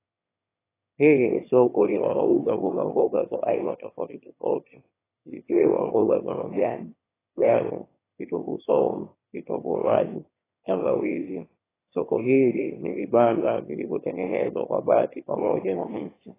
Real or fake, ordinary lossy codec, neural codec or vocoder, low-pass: fake; AAC, 16 kbps; autoencoder, 22.05 kHz, a latent of 192 numbers a frame, VITS, trained on one speaker; 3.6 kHz